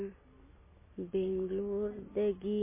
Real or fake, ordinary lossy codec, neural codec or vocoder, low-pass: real; MP3, 16 kbps; none; 3.6 kHz